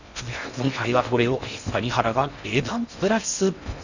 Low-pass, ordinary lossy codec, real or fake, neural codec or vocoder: 7.2 kHz; none; fake; codec, 16 kHz in and 24 kHz out, 0.6 kbps, FocalCodec, streaming, 2048 codes